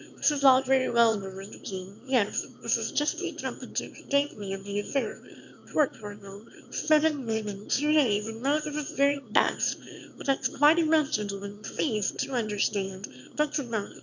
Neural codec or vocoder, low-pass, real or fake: autoencoder, 22.05 kHz, a latent of 192 numbers a frame, VITS, trained on one speaker; 7.2 kHz; fake